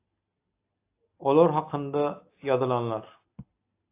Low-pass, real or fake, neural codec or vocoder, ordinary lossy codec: 3.6 kHz; real; none; AAC, 24 kbps